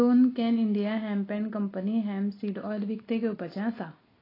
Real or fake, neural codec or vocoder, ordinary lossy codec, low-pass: real; none; AAC, 24 kbps; 5.4 kHz